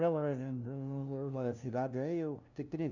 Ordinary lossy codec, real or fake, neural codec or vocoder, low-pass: none; fake; codec, 16 kHz, 0.5 kbps, FunCodec, trained on LibriTTS, 25 frames a second; 7.2 kHz